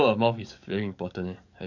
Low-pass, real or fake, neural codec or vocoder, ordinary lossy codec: 7.2 kHz; fake; codec, 44.1 kHz, 7.8 kbps, DAC; none